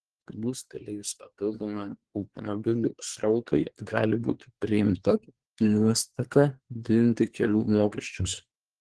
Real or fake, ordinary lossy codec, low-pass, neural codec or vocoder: fake; Opus, 16 kbps; 10.8 kHz; codec, 24 kHz, 1 kbps, SNAC